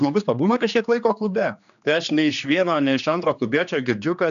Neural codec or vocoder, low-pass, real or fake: codec, 16 kHz, 2 kbps, X-Codec, HuBERT features, trained on general audio; 7.2 kHz; fake